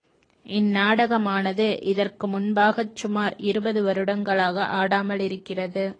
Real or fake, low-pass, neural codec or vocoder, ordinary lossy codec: fake; 9.9 kHz; codec, 24 kHz, 6 kbps, HILCodec; AAC, 32 kbps